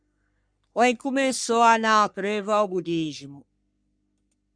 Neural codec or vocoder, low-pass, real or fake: codec, 44.1 kHz, 3.4 kbps, Pupu-Codec; 9.9 kHz; fake